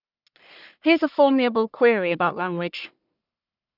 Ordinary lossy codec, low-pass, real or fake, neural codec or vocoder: none; 5.4 kHz; fake; codec, 44.1 kHz, 1.7 kbps, Pupu-Codec